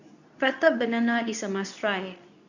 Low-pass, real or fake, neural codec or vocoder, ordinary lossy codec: 7.2 kHz; fake; codec, 24 kHz, 0.9 kbps, WavTokenizer, medium speech release version 2; none